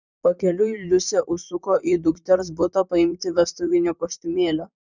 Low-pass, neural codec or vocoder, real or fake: 7.2 kHz; vocoder, 44.1 kHz, 128 mel bands, Pupu-Vocoder; fake